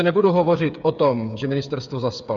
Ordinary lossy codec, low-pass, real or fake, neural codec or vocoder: Opus, 64 kbps; 7.2 kHz; fake; codec, 16 kHz, 8 kbps, FreqCodec, smaller model